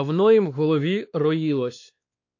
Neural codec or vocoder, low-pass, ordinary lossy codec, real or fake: codec, 16 kHz, 2 kbps, X-Codec, WavLM features, trained on Multilingual LibriSpeech; 7.2 kHz; AAC, 48 kbps; fake